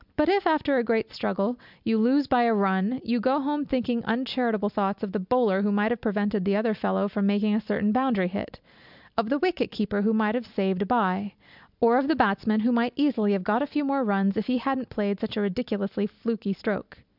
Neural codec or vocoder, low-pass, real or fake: none; 5.4 kHz; real